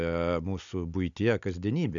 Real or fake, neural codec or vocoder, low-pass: real; none; 7.2 kHz